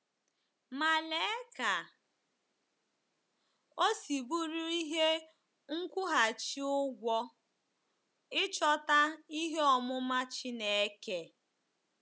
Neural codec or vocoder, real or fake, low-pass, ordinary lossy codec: none; real; none; none